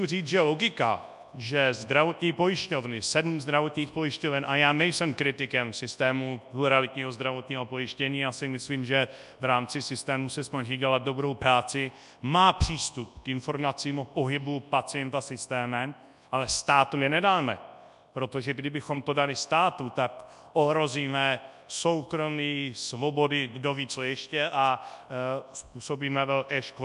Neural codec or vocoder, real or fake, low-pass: codec, 24 kHz, 0.9 kbps, WavTokenizer, large speech release; fake; 10.8 kHz